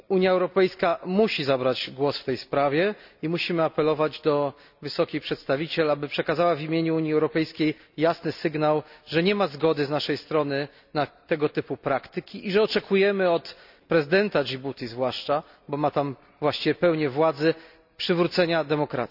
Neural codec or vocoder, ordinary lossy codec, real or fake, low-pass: none; none; real; 5.4 kHz